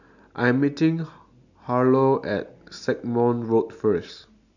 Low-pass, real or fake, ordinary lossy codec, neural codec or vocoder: 7.2 kHz; real; none; none